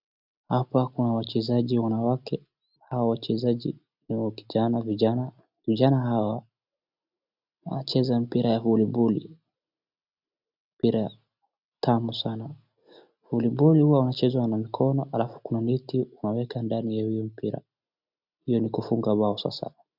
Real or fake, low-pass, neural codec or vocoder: real; 5.4 kHz; none